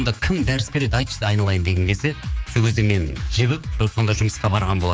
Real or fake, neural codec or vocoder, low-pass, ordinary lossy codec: fake; codec, 16 kHz, 4 kbps, X-Codec, HuBERT features, trained on general audio; none; none